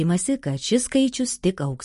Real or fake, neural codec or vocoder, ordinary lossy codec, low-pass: real; none; MP3, 48 kbps; 14.4 kHz